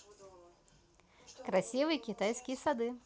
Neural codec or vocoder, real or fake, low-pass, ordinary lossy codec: none; real; none; none